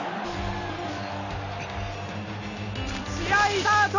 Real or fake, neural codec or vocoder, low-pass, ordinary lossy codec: real; none; 7.2 kHz; none